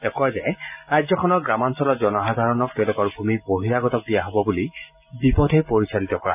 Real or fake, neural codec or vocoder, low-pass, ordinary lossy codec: real; none; 3.6 kHz; none